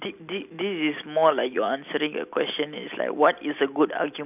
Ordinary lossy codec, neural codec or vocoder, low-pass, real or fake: none; none; 3.6 kHz; real